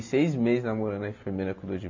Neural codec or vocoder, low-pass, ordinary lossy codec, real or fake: none; 7.2 kHz; AAC, 48 kbps; real